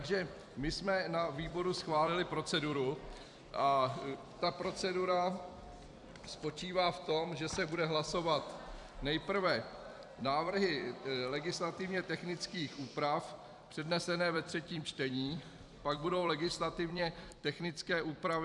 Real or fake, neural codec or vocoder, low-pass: fake; vocoder, 44.1 kHz, 128 mel bands every 256 samples, BigVGAN v2; 10.8 kHz